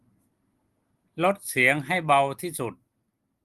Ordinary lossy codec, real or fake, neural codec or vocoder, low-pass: Opus, 24 kbps; real; none; 14.4 kHz